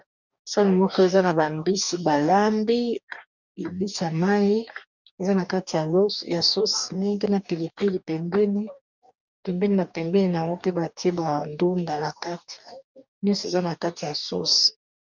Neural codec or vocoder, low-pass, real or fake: codec, 44.1 kHz, 2.6 kbps, DAC; 7.2 kHz; fake